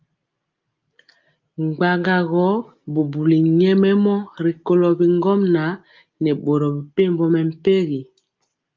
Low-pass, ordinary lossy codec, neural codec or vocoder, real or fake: 7.2 kHz; Opus, 24 kbps; none; real